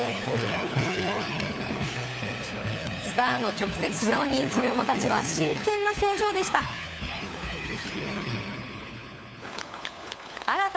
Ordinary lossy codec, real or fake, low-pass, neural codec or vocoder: none; fake; none; codec, 16 kHz, 4 kbps, FunCodec, trained on LibriTTS, 50 frames a second